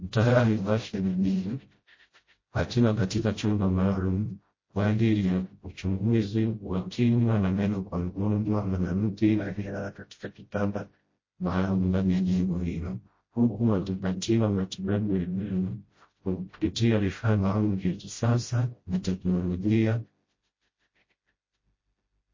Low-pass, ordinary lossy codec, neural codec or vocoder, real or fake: 7.2 kHz; MP3, 32 kbps; codec, 16 kHz, 0.5 kbps, FreqCodec, smaller model; fake